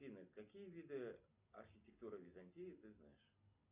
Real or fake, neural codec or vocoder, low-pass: real; none; 3.6 kHz